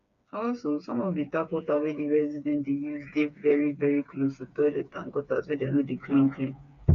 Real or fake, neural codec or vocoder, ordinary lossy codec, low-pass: fake; codec, 16 kHz, 4 kbps, FreqCodec, smaller model; none; 7.2 kHz